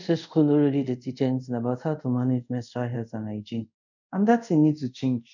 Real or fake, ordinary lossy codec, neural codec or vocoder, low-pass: fake; none; codec, 24 kHz, 0.5 kbps, DualCodec; 7.2 kHz